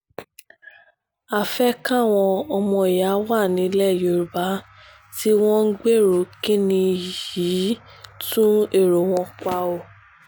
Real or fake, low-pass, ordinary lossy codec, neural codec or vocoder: real; none; none; none